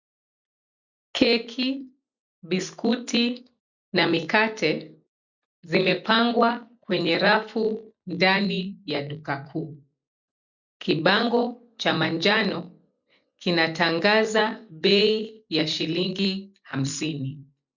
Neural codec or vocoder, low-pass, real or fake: none; 7.2 kHz; real